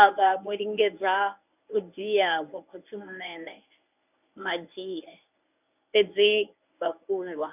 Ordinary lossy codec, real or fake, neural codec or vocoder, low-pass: none; fake; codec, 24 kHz, 0.9 kbps, WavTokenizer, medium speech release version 1; 3.6 kHz